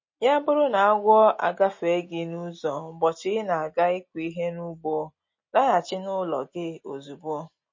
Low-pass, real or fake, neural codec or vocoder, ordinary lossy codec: 7.2 kHz; real; none; MP3, 32 kbps